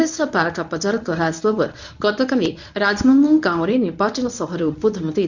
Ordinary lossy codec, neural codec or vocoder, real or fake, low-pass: none; codec, 24 kHz, 0.9 kbps, WavTokenizer, medium speech release version 1; fake; 7.2 kHz